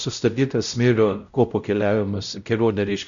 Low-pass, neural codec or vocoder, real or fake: 7.2 kHz; codec, 16 kHz, 0.5 kbps, X-Codec, WavLM features, trained on Multilingual LibriSpeech; fake